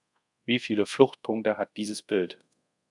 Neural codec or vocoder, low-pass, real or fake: codec, 24 kHz, 0.5 kbps, DualCodec; 10.8 kHz; fake